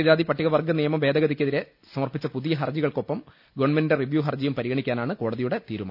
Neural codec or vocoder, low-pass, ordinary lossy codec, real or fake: none; 5.4 kHz; none; real